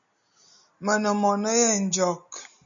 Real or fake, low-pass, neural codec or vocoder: real; 7.2 kHz; none